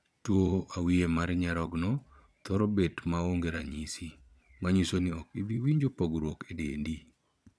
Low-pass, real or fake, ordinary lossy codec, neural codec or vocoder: none; fake; none; vocoder, 22.05 kHz, 80 mel bands, Vocos